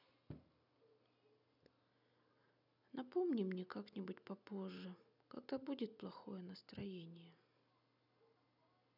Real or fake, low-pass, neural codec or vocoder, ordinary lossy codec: real; 5.4 kHz; none; none